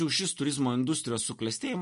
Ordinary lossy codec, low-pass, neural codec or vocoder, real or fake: MP3, 48 kbps; 10.8 kHz; none; real